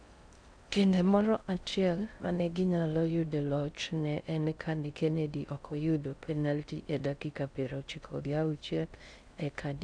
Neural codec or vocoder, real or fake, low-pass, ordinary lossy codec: codec, 16 kHz in and 24 kHz out, 0.6 kbps, FocalCodec, streaming, 2048 codes; fake; 9.9 kHz; none